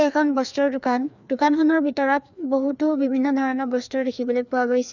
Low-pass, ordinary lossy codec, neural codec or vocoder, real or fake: 7.2 kHz; none; codec, 16 kHz, 2 kbps, FreqCodec, larger model; fake